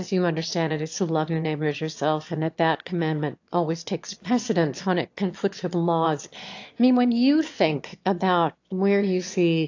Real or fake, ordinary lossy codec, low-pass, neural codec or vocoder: fake; AAC, 48 kbps; 7.2 kHz; autoencoder, 22.05 kHz, a latent of 192 numbers a frame, VITS, trained on one speaker